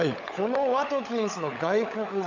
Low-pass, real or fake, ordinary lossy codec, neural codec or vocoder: 7.2 kHz; fake; none; codec, 16 kHz, 16 kbps, FunCodec, trained on LibriTTS, 50 frames a second